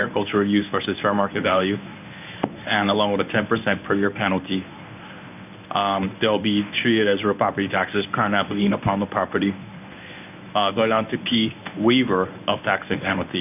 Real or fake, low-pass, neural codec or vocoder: fake; 3.6 kHz; codec, 24 kHz, 0.9 kbps, WavTokenizer, medium speech release version 1